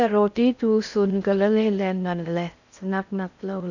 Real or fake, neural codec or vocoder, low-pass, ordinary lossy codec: fake; codec, 16 kHz in and 24 kHz out, 0.6 kbps, FocalCodec, streaming, 4096 codes; 7.2 kHz; none